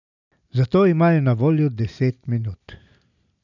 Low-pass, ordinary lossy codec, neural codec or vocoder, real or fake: 7.2 kHz; none; none; real